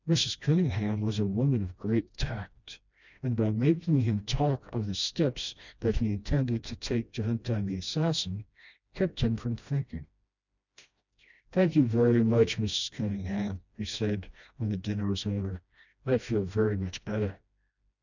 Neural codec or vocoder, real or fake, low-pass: codec, 16 kHz, 1 kbps, FreqCodec, smaller model; fake; 7.2 kHz